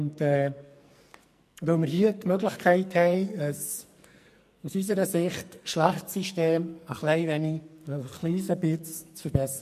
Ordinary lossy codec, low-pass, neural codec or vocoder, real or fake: MP3, 64 kbps; 14.4 kHz; codec, 44.1 kHz, 2.6 kbps, SNAC; fake